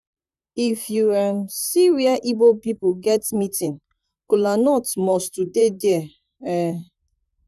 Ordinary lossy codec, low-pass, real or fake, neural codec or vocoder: none; 14.4 kHz; fake; vocoder, 44.1 kHz, 128 mel bands, Pupu-Vocoder